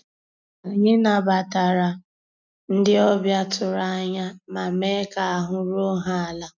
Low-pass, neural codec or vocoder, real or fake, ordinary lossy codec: 7.2 kHz; none; real; none